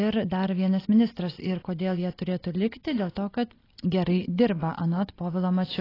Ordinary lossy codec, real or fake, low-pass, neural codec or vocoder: AAC, 24 kbps; real; 5.4 kHz; none